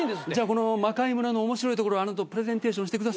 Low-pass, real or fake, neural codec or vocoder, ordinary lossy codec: none; real; none; none